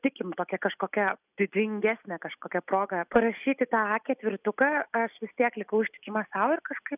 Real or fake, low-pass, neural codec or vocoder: real; 3.6 kHz; none